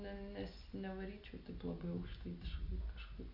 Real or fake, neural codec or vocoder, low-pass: real; none; 5.4 kHz